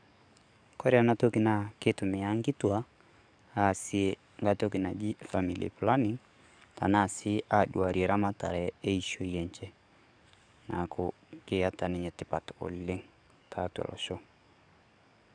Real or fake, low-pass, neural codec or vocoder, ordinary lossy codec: fake; 9.9 kHz; codec, 44.1 kHz, 7.8 kbps, DAC; none